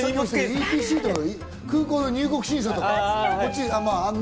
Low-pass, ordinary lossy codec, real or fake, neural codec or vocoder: none; none; real; none